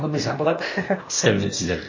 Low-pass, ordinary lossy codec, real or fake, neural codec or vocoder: 7.2 kHz; MP3, 32 kbps; fake; codec, 16 kHz, 0.8 kbps, ZipCodec